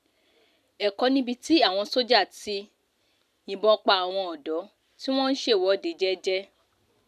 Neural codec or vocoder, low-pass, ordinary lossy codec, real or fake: none; 14.4 kHz; none; real